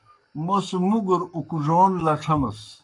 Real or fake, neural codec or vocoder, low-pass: fake; codec, 44.1 kHz, 7.8 kbps, Pupu-Codec; 10.8 kHz